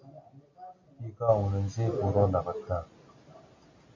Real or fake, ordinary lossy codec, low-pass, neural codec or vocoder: real; MP3, 48 kbps; 7.2 kHz; none